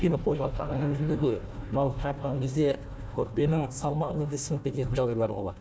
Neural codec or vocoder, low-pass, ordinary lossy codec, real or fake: codec, 16 kHz, 1 kbps, FunCodec, trained on Chinese and English, 50 frames a second; none; none; fake